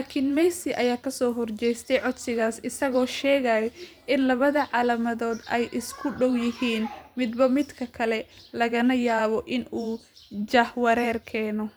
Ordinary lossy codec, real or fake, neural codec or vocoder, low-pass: none; fake; vocoder, 44.1 kHz, 128 mel bands every 512 samples, BigVGAN v2; none